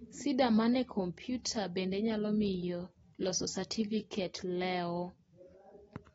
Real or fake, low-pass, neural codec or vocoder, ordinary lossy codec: real; 14.4 kHz; none; AAC, 24 kbps